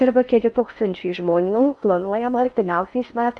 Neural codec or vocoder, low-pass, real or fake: codec, 16 kHz in and 24 kHz out, 0.6 kbps, FocalCodec, streaming, 4096 codes; 10.8 kHz; fake